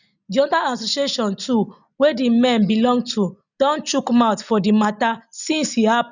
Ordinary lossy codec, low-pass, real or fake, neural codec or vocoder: none; 7.2 kHz; real; none